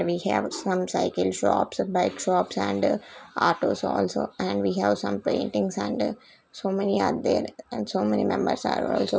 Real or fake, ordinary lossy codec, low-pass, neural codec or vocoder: real; none; none; none